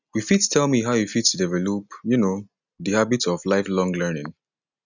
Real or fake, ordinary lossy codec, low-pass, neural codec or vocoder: real; none; 7.2 kHz; none